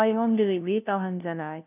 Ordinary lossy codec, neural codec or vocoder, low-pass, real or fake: none; codec, 16 kHz, 0.5 kbps, FunCodec, trained on LibriTTS, 25 frames a second; 3.6 kHz; fake